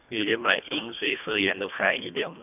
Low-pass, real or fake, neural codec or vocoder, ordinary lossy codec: 3.6 kHz; fake; codec, 24 kHz, 1.5 kbps, HILCodec; none